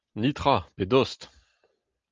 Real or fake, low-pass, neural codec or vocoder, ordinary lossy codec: real; 7.2 kHz; none; Opus, 24 kbps